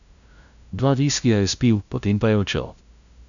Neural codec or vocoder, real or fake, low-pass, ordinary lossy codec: codec, 16 kHz, 0.5 kbps, FunCodec, trained on LibriTTS, 25 frames a second; fake; 7.2 kHz; none